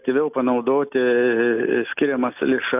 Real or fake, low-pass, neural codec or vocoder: real; 3.6 kHz; none